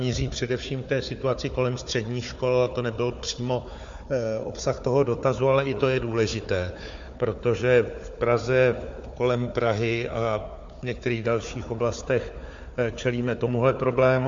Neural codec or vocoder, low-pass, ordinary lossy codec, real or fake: codec, 16 kHz, 4 kbps, FunCodec, trained on Chinese and English, 50 frames a second; 7.2 kHz; MP3, 48 kbps; fake